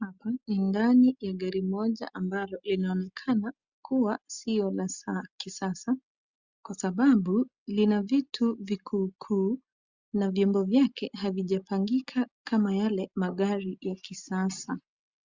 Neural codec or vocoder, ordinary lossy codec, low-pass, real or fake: none; Opus, 64 kbps; 7.2 kHz; real